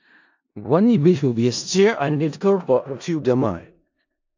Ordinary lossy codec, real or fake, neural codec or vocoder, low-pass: AAC, 48 kbps; fake; codec, 16 kHz in and 24 kHz out, 0.4 kbps, LongCat-Audio-Codec, four codebook decoder; 7.2 kHz